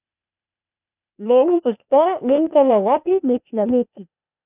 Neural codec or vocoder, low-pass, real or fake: codec, 16 kHz, 0.8 kbps, ZipCodec; 3.6 kHz; fake